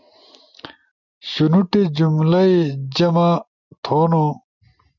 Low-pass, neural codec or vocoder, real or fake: 7.2 kHz; none; real